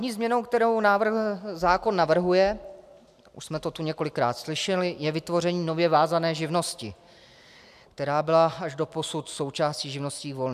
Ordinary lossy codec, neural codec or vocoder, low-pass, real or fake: AAC, 96 kbps; none; 14.4 kHz; real